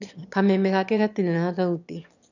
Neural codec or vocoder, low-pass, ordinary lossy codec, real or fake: autoencoder, 22.05 kHz, a latent of 192 numbers a frame, VITS, trained on one speaker; 7.2 kHz; none; fake